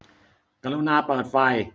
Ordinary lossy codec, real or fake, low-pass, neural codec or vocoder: none; real; none; none